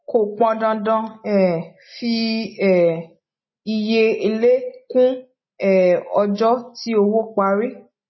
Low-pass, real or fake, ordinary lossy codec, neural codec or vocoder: 7.2 kHz; real; MP3, 24 kbps; none